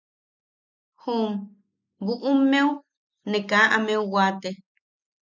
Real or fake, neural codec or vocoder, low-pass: real; none; 7.2 kHz